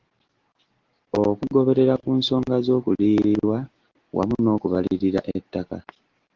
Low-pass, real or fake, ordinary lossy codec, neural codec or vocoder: 7.2 kHz; real; Opus, 16 kbps; none